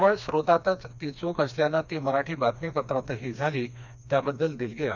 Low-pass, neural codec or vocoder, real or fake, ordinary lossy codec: 7.2 kHz; codec, 16 kHz, 2 kbps, FreqCodec, smaller model; fake; none